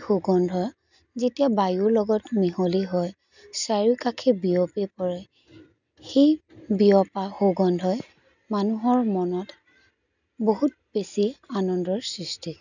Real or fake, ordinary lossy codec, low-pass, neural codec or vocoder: real; none; 7.2 kHz; none